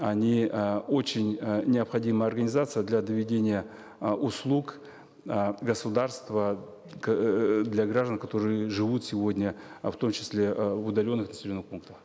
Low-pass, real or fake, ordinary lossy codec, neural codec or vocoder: none; real; none; none